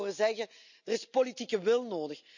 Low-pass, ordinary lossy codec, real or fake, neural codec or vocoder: 7.2 kHz; none; real; none